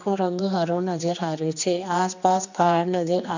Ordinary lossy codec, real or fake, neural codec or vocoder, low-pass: none; fake; codec, 16 kHz, 2 kbps, X-Codec, HuBERT features, trained on general audio; 7.2 kHz